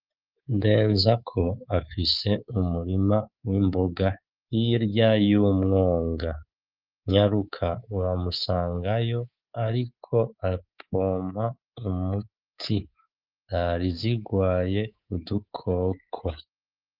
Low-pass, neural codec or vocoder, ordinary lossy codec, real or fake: 5.4 kHz; codec, 24 kHz, 3.1 kbps, DualCodec; Opus, 24 kbps; fake